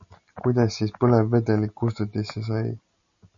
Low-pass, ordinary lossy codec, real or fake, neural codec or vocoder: 7.2 kHz; MP3, 64 kbps; real; none